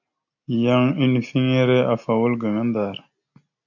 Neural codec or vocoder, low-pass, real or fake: none; 7.2 kHz; real